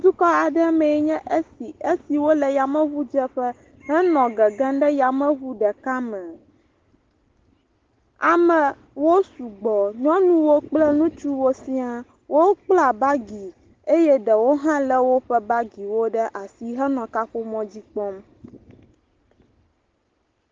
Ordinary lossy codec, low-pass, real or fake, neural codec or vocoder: Opus, 16 kbps; 7.2 kHz; real; none